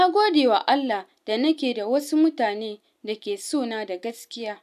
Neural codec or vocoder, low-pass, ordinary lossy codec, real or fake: none; 14.4 kHz; none; real